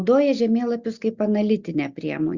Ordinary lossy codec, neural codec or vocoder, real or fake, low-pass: Opus, 64 kbps; none; real; 7.2 kHz